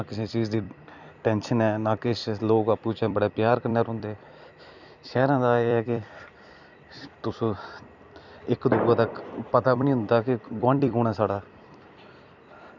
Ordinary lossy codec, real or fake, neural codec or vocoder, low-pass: none; fake; vocoder, 44.1 kHz, 128 mel bands every 256 samples, BigVGAN v2; 7.2 kHz